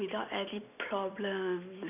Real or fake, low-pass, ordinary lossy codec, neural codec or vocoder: real; 3.6 kHz; none; none